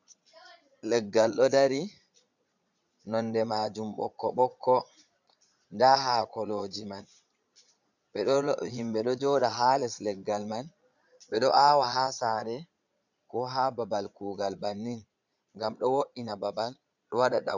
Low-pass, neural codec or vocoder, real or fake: 7.2 kHz; vocoder, 22.05 kHz, 80 mel bands, Vocos; fake